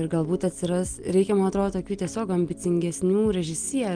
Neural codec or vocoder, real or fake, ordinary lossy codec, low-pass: none; real; Opus, 24 kbps; 9.9 kHz